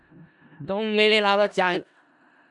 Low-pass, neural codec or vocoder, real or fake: 10.8 kHz; codec, 16 kHz in and 24 kHz out, 0.4 kbps, LongCat-Audio-Codec, four codebook decoder; fake